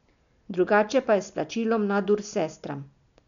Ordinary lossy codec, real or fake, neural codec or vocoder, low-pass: none; real; none; 7.2 kHz